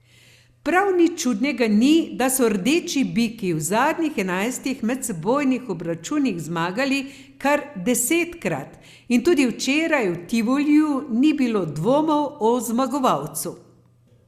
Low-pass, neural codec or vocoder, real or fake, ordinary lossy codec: 14.4 kHz; none; real; Opus, 64 kbps